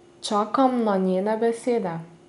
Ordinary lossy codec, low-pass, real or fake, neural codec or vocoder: none; 10.8 kHz; real; none